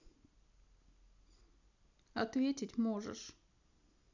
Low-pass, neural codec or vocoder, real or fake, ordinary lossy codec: 7.2 kHz; none; real; none